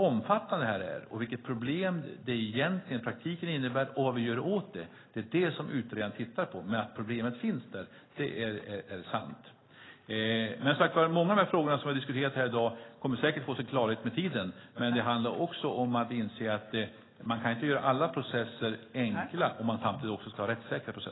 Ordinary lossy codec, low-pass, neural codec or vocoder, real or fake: AAC, 16 kbps; 7.2 kHz; none; real